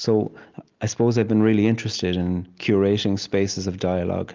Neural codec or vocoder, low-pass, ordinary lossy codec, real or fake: none; 7.2 kHz; Opus, 32 kbps; real